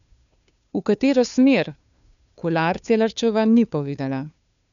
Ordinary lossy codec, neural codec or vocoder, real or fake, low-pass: none; codec, 16 kHz, 2 kbps, FunCodec, trained on Chinese and English, 25 frames a second; fake; 7.2 kHz